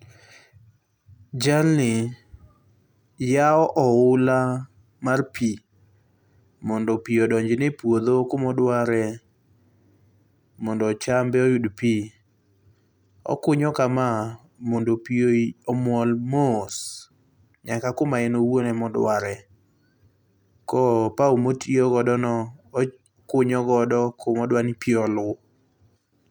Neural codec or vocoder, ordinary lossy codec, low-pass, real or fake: none; none; 19.8 kHz; real